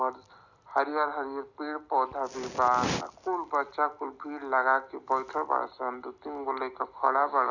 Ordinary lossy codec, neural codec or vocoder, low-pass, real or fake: none; none; 7.2 kHz; real